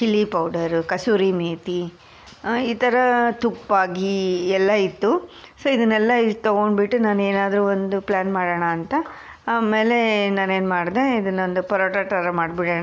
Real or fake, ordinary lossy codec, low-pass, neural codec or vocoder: real; none; none; none